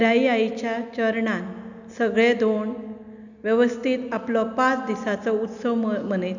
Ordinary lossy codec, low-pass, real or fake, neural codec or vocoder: none; 7.2 kHz; real; none